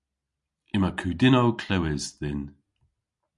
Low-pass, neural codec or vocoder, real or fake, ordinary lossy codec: 10.8 kHz; none; real; MP3, 96 kbps